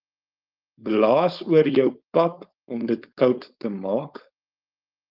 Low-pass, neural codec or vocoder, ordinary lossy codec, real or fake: 5.4 kHz; codec, 16 kHz, 4.8 kbps, FACodec; Opus, 24 kbps; fake